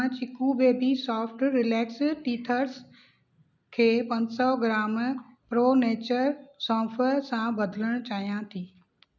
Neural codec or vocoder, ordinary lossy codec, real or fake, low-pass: none; none; real; 7.2 kHz